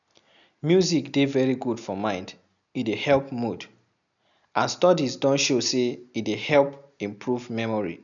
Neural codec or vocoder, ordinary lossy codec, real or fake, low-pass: none; none; real; 7.2 kHz